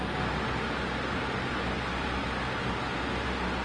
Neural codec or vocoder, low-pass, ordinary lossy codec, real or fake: none; 9.9 kHz; Opus, 24 kbps; real